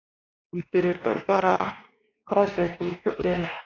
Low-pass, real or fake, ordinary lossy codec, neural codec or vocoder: 7.2 kHz; fake; AAC, 32 kbps; codec, 16 kHz, 2 kbps, X-Codec, WavLM features, trained on Multilingual LibriSpeech